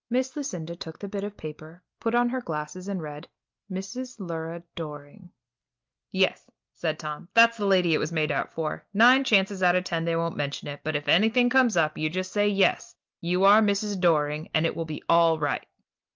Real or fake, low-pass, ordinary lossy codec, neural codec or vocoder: real; 7.2 kHz; Opus, 24 kbps; none